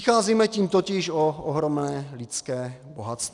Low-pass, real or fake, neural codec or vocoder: 10.8 kHz; real; none